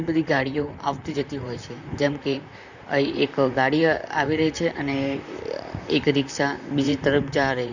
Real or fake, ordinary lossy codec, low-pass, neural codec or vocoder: fake; none; 7.2 kHz; vocoder, 44.1 kHz, 128 mel bands, Pupu-Vocoder